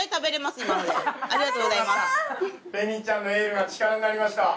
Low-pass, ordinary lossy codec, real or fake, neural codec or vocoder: none; none; real; none